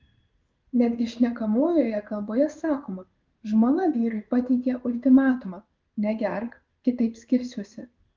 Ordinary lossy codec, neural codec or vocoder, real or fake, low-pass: Opus, 32 kbps; codec, 16 kHz in and 24 kHz out, 1 kbps, XY-Tokenizer; fake; 7.2 kHz